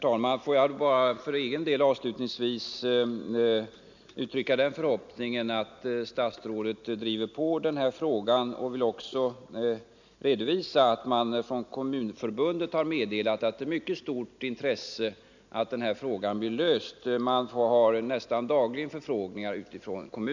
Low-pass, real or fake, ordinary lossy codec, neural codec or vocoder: 7.2 kHz; real; none; none